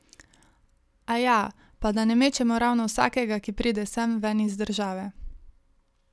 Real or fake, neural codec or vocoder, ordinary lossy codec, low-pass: real; none; none; none